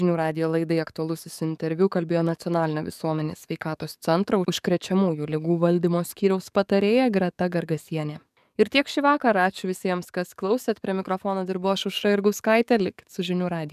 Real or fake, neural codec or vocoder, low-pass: fake; codec, 44.1 kHz, 7.8 kbps, DAC; 14.4 kHz